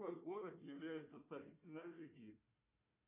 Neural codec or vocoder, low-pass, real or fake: codec, 16 kHz, 1 kbps, FunCodec, trained on Chinese and English, 50 frames a second; 3.6 kHz; fake